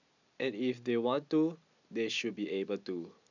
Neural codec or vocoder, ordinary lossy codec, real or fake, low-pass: none; none; real; 7.2 kHz